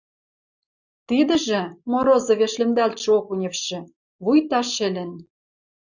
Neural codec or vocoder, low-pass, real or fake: none; 7.2 kHz; real